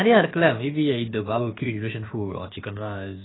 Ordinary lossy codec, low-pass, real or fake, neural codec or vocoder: AAC, 16 kbps; 7.2 kHz; fake; codec, 16 kHz, about 1 kbps, DyCAST, with the encoder's durations